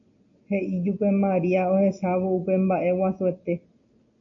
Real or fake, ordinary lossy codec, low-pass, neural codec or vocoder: real; AAC, 64 kbps; 7.2 kHz; none